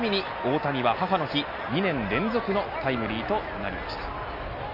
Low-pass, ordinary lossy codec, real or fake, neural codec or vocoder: 5.4 kHz; none; real; none